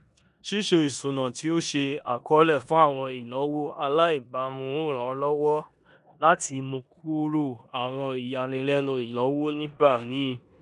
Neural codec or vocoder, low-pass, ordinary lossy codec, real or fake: codec, 16 kHz in and 24 kHz out, 0.9 kbps, LongCat-Audio-Codec, four codebook decoder; 10.8 kHz; none; fake